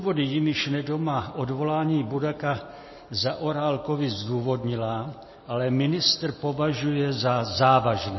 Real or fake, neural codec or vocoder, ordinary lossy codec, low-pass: real; none; MP3, 24 kbps; 7.2 kHz